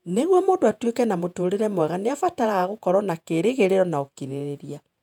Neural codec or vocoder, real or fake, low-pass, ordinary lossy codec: vocoder, 48 kHz, 128 mel bands, Vocos; fake; 19.8 kHz; none